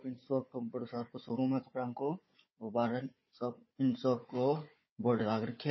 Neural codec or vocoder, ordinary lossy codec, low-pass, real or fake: codec, 16 kHz, 4 kbps, FunCodec, trained on Chinese and English, 50 frames a second; MP3, 24 kbps; 7.2 kHz; fake